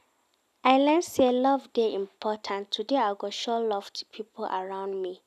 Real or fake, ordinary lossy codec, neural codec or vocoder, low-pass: real; none; none; 14.4 kHz